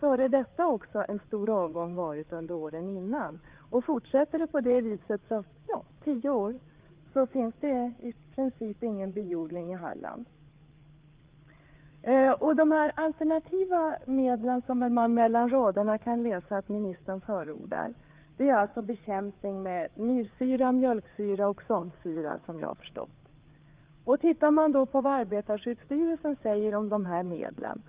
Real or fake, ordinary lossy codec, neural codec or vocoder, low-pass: fake; Opus, 32 kbps; codec, 16 kHz, 4 kbps, FreqCodec, larger model; 3.6 kHz